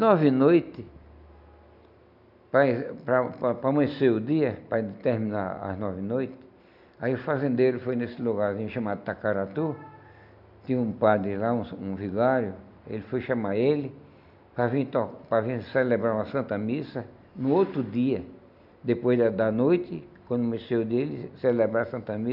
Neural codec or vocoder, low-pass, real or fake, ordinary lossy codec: none; 5.4 kHz; real; none